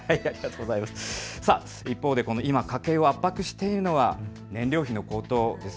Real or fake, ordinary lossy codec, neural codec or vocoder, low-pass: real; none; none; none